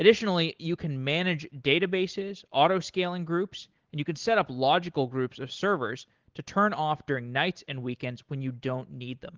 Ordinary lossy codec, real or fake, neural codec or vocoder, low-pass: Opus, 16 kbps; real; none; 7.2 kHz